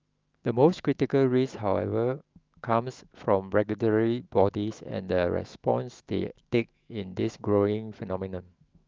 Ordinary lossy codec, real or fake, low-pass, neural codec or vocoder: Opus, 24 kbps; fake; 7.2 kHz; autoencoder, 48 kHz, 128 numbers a frame, DAC-VAE, trained on Japanese speech